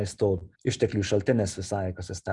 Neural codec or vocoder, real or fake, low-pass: none; real; 10.8 kHz